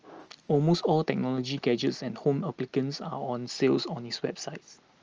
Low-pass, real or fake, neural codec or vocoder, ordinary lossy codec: 7.2 kHz; real; none; Opus, 24 kbps